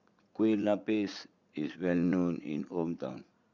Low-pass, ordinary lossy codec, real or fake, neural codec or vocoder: 7.2 kHz; Opus, 64 kbps; fake; vocoder, 44.1 kHz, 80 mel bands, Vocos